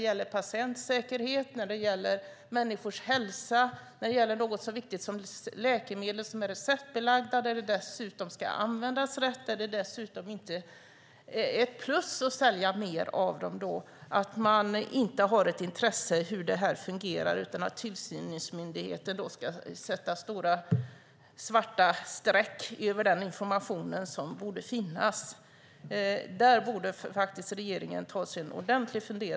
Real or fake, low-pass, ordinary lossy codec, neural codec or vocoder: real; none; none; none